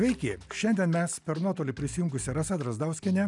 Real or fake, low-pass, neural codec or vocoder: real; 10.8 kHz; none